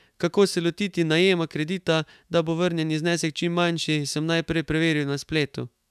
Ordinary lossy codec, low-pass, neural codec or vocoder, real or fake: none; 14.4 kHz; autoencoder, 48 kHz, 128 numbers a frame, DAC-VAE, trained on Japanese speech; fake